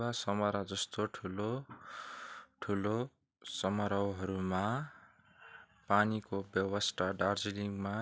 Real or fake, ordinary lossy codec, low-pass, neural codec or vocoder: real; none; none; none